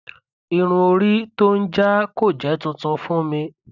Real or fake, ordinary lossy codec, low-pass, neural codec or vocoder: real; none; 7.2 kHz; none